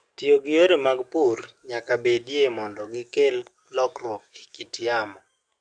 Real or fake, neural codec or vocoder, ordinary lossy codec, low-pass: fake; codec, 44.1 kHz, 7.8 kbps, DAC; none; 9.9 kHz